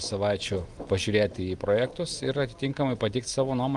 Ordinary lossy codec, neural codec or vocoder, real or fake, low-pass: Opus, 32 kbps; none; real; 10.8 kHz